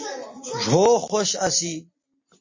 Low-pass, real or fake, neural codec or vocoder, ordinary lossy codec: 7.2 kHz; real; none; MP3, 32 kbps